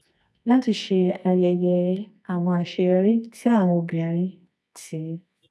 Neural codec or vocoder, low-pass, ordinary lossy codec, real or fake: codec, 24 kHz, 0.9 kbps, WavTokenizer, medium music audio release; none; none; fake